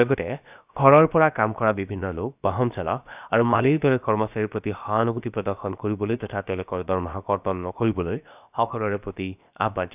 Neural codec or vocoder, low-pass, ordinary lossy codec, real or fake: codec, 16 kHz, about 1 kbps, DyCAST, with the encoder's durations; 3.6 kHz; none; fake